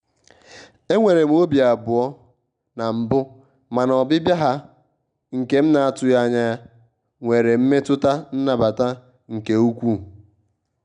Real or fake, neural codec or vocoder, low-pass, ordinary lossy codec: real; none; 9.9 kHz; none